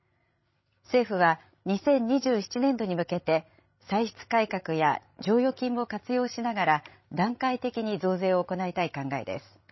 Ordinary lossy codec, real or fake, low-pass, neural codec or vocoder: MP3, 24 kbps; fake; 7.2 kHz; codec, 16 kHz, 16 kbps, FreqCodec, larger model